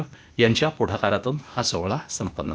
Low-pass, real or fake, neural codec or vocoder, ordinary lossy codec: none; fake; codec, 16 kHz, 0.8 kbps, ZipCodec; none